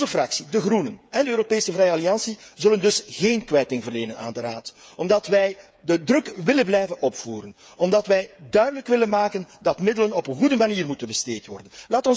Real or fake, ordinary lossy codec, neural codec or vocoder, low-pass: fake; none; codec, 16 kHz, 8 kbps, FreqCodec, smaller model; none